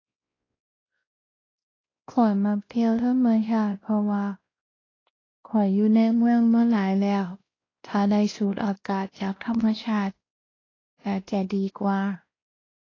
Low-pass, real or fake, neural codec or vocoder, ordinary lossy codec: 7.2 kHz; fake; codec, 16 kHz, 1 kbps, X-Codec, WavLM features, trained on Multilingual LibriSpeech; AAC, 32 kbps